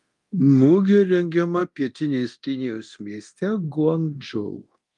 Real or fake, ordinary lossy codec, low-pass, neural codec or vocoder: fake; Opus, 32 kbps; 10.8 kHz; codec, 24 kHz, 0.9 kbps, DualCodec